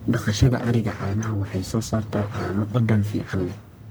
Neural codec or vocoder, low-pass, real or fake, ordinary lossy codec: codec, 44.1 kHz, 1.7 kbps, Pupu-Codec; none; fake; none